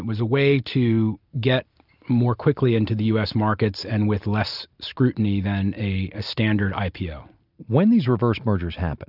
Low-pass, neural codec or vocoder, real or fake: 5.4 kHz; none; real